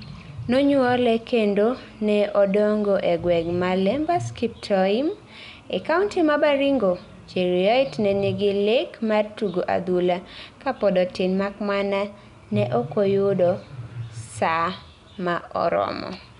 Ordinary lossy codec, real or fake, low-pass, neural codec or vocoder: none; real; 10.8 kHz; none